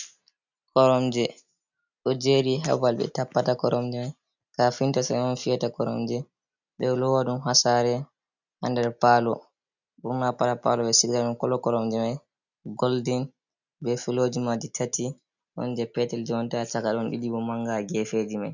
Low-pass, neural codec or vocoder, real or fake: 7.2 kHz; none; real